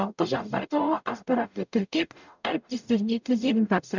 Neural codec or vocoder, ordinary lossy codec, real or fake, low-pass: codec, 44.1 kHz, 0.9 kbps, DAC; none; fake; 7.2 kHz